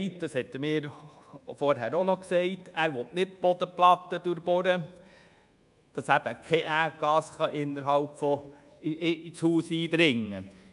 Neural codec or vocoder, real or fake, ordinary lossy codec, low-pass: codec, 24 kHz, 1.2 kbps, DualCodec; fake; none; 10.8 kHz